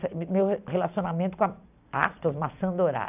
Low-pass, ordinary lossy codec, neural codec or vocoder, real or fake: 3.6 kHz; none; none; real